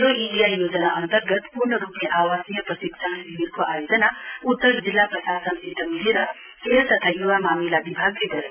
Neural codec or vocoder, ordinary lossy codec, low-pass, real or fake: none; none; 3.6 kHz; real